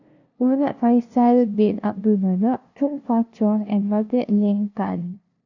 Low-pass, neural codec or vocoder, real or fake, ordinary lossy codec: 7.2 kHz; codec, 16 kHz, 0.5 kbps, FunCodec, trained on LibriTTS, 25 frames a second; fake; none